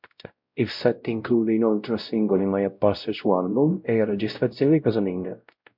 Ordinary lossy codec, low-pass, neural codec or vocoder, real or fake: MP3, 48 kbps; 5.4 kHz; codec, 16 kHz, 0.5 kbps, X-Codec, WavLM features, trained on Multilingual LibriSpeech; fake